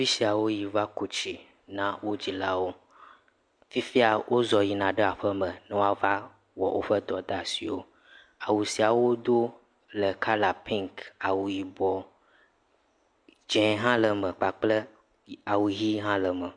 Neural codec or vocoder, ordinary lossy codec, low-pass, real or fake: none; MP3, 64 kbps; 9.9 kHz; real